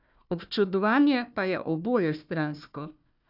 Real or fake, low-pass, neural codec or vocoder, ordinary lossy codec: fake; 5.4 kHz; codec, 16 kHz, 1 kbps, FunCodec, trained on Chinese and English, 50 frames a second; none